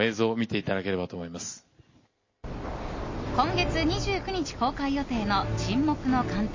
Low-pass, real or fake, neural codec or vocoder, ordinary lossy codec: 7.2 kHz; real; none; MP3, 32 kbps